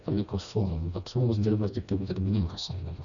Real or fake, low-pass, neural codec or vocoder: fake; 7.2 kHz; codec, 16 kHz, 1 kbps, FreqCodec, smaller model